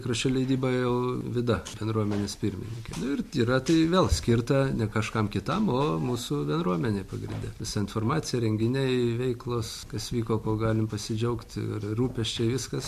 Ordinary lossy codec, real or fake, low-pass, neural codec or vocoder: MP3, 64 kbps; real; 14.4 kHz; none